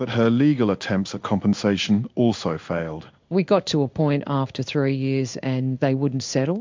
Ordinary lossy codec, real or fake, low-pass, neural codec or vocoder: MP3, 64 kbps; fake; 7.2 kHz; codec, 16 kHz in and 24 kHz out, 1 kbps, XY-Tokenizer